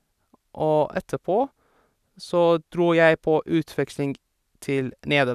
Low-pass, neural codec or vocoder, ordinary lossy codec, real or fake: 14.4 kHz; none; none; real